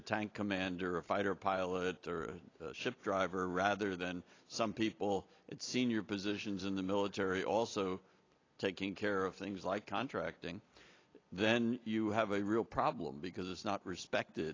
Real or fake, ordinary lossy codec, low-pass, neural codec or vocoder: real; AAC, 32 kbps; 7.2 kHz; none